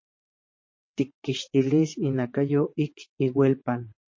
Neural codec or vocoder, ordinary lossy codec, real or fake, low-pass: vocoder, 22.05 kHz, 80 mel bands, WaveNeXt; MP3, 32 kbps; fake; 7.2 kHz